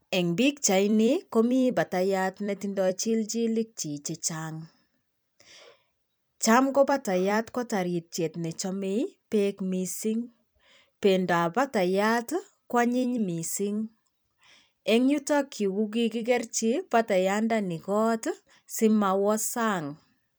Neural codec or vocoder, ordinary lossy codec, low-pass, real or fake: vocoder, 44.1 kHz, 128 mel bands every 256 samples, BigVGAN v2; none; none; fake